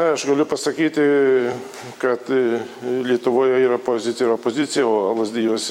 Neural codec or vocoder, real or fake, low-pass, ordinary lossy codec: vocoder, 44.1 kHz, 128 mel bands every 256 samples, BigVGAN v2; fake; 19.8 kHz; MP3, 96 kbps